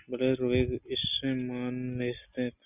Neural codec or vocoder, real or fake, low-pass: none; real; 3.6 kHz